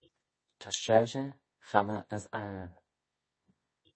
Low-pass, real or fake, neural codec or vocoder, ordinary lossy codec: 9.9 kHz; fake; codec, 24 kHz, 0.9 kbps, WavTokenizer, medium music audio release; MP3, 32 kbps